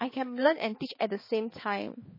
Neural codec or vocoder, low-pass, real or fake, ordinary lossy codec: codec, 16 kHz, 4 kbps, X-Codec, HuBERT features, trained on balanced general audio; 5.4 kHz; fake; MP3, 24 kbps